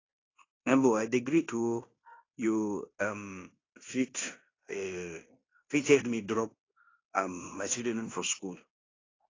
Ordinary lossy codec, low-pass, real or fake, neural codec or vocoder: AAC, 32 kbps; 7.2 kHz; fake; codec, 16 kHz in and 24 kHz out, 0.9 kbps, LongCat-Audio-Codec, fine tuned four codebook decoder